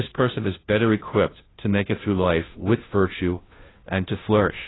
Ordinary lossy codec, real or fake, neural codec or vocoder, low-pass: AAC, 16 kbps; fake; codec, 16 kHz, 0.5 kbps, FunCodec, trained on Chinese and English, 25 frames a second; 7.2 kHz